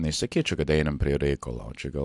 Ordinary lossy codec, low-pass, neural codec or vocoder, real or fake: AAC, 64 kbps; 10.8 kHz; none; real